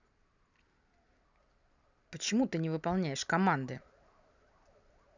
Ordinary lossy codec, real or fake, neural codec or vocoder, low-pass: none; real; none; 7.2 kHz